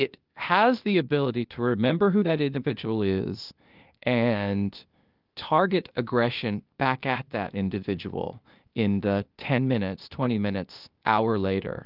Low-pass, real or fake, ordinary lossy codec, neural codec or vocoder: 5.4 kHz; fake; Opus, 24 kbps; codec, 16 kHz, 0.8 kbps, ZipCodec